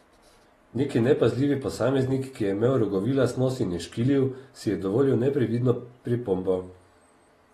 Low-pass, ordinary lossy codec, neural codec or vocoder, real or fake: 19.8 kHz; AAC, 32 kbps; none; real